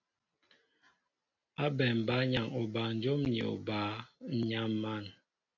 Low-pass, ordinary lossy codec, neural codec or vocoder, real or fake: 7.2 kHz; AAC, 48 kbps; none; real